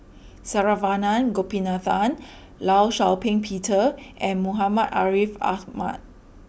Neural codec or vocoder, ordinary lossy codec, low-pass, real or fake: none; none; none; real